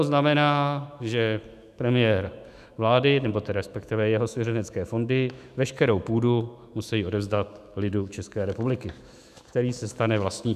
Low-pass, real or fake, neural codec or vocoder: 14.4 kHz; fake; autoencoder, 48 kHz, 128 numbers a frame, DAC-VAE, trained on Japanese speech